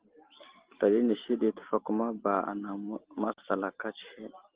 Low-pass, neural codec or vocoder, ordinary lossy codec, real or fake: 3.6 kHz; none; Opus, 16 kbps; real